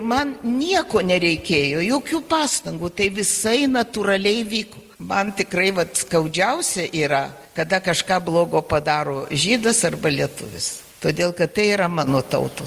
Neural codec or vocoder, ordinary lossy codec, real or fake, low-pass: none; Opus, 32 kbps; real; 14.4 kHz